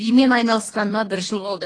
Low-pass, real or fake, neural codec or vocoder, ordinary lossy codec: 9.9 kHz; fake; codec, 24 kHz, 1.5 kbps, HILCodec; AAC, 32 kbps